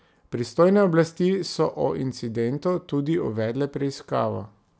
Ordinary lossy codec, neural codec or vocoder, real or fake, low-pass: none; none; real; none